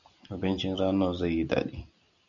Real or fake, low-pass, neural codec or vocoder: real; 7.2 kHz; none